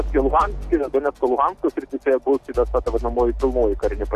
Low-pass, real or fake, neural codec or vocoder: 14.4 kHz; real; none